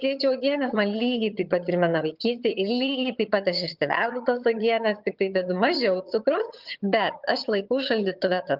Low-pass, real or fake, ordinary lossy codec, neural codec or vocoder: 5.4 kHz; fake; Opus, 32 kbps; vocoder, 22.05 kHz, 80 mel bands, HiFi-GAN